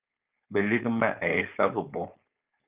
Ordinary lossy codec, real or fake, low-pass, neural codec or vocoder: Opus, 24 kbps; fake; 3.6 kHz; codec, 16 kHz, 4.8 kbps, FACodec